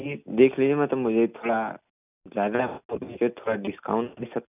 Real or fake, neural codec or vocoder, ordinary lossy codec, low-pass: real; none; none; 3.6 kHz